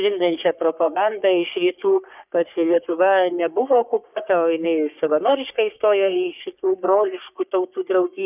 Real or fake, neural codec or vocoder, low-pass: fake; codec, 44.1 kHz, 3.4 kbps, Pupu-Codec; 3.6 kHz